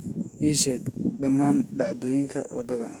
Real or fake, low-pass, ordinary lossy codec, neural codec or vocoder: fake; 19.8 kHz; none; codec, 44.1 kHz, 2.6 kbps, DAC